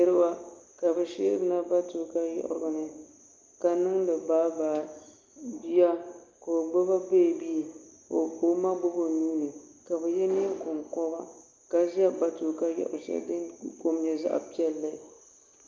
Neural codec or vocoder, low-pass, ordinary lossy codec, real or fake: none; 7.2 kHz; Opus, 24 kbps; real